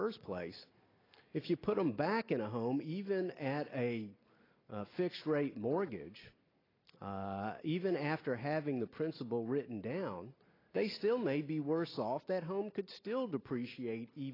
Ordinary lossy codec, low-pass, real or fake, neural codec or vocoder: AAC, 24 kbps; 5.4 kHz; real; none